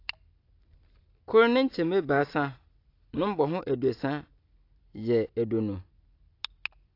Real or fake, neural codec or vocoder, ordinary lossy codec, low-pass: real; none; AAC, 32 kbps; 5.4 kHz